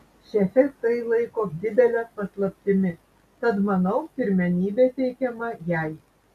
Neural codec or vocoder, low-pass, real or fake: none; 14.4 kHz; real